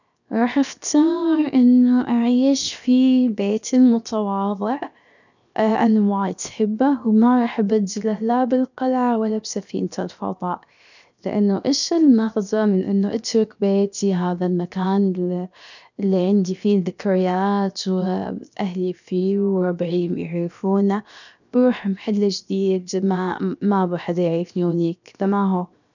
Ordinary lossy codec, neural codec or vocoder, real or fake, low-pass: none; codec, 16 kHz, 0.7 kbps, FocalCodec; fake; 7.2 kHz